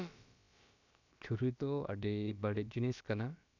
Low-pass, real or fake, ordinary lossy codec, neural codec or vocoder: 7.2 kHz; fake; none; codec, 16 kHz, about 1 kbps, DyCAST, with the encoder's durations